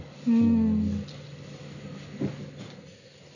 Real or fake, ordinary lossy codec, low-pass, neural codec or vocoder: real; none; 7.2 kHz; none